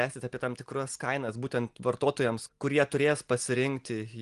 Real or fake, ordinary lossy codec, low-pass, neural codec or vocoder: real; Opus, 24 kbps; 10.8 kHz; none